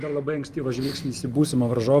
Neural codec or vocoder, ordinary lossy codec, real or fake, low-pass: none; Opus, 32 kbps; real; 14.4 kHz